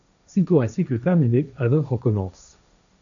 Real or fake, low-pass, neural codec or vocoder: fake; 7.2 kHz; codec, 16 kHz, 1.1 kbps, Voila-Tokenizer